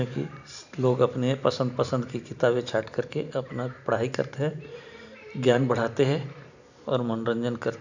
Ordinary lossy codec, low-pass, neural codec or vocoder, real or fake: none; 7.2 kHz; vocoder, 44.1 kHz, 128 mel bands every 512 samples, BigVGAN v2; fake